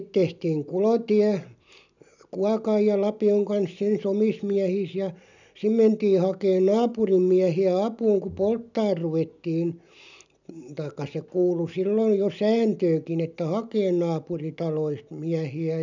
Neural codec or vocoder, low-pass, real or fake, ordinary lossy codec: none; 7.2 kHz; real; none